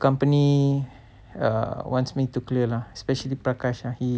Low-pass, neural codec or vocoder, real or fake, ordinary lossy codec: none; none; real; none